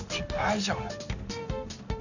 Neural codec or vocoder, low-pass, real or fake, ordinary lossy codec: codec, 16 kHz in and 24 kHz out, 1 kbps, XY-Tokenizer; 7.2 kHz; fake; none